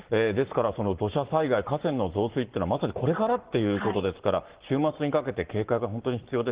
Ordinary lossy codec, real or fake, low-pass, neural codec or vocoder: Opus, 16 kbps; fake; 3.6 kHz; codec, 44.1 kHz, 7.8 kbps, Pupu-Codec